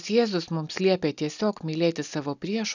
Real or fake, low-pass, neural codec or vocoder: real; 7.2 kHz; none